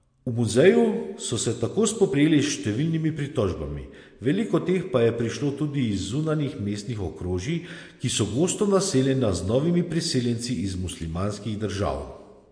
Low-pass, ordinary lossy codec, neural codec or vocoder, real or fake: 9.9 kHz; MP3, 48 kbps; none; real